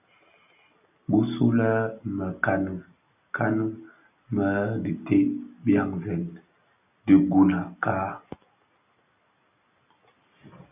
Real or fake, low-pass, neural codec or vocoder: real; 3.6 kHz; none